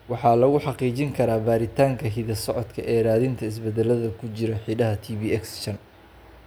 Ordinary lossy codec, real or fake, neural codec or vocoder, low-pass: none; real; none; none